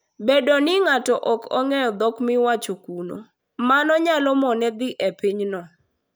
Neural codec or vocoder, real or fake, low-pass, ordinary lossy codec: none; real; none; none